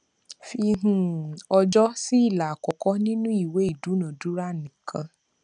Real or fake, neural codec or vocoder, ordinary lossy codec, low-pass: real; none; none; 9.9 kHz